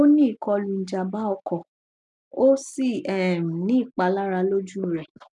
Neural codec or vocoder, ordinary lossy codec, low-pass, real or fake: none; none; 10.8 kHz; real